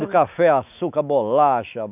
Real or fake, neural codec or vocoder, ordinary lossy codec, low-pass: fake; autoencoder, 48 kHz, 128 numbers a frame, DAC-VAE, trained on Japanese speech; none; 3.6 kHz